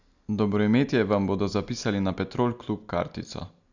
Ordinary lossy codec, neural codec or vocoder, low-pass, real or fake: none; none; 7.2 kHz; real